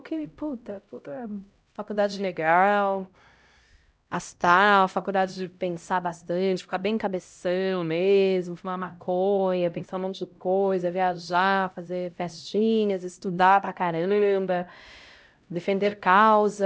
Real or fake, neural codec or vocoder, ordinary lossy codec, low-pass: fake; codec, 16 kHz, 0.5 kbps, X-Codec, HuBERT features, trained on LibriSpeech; none; none